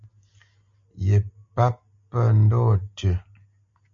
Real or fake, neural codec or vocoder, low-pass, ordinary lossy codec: real; none; 7.2 kHz; MP3, 48 kbps